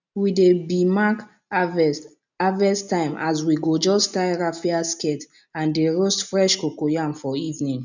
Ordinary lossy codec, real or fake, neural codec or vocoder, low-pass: none; real; none; 7.2 kHz